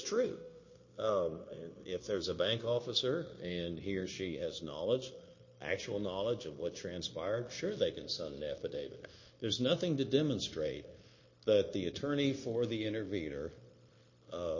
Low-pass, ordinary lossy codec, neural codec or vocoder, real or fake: 7.2 kHz; MP3, 32 kbps; codec, 16 kHz, 0.9 kbps, LongCat-Audio-Codec; fake